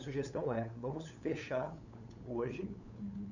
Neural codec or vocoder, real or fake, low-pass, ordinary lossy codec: codec, 16 kHz, 8 kbps, FunCodec, trained on LibriTTS, 25 frames a second; fake; 7.2 kHz; none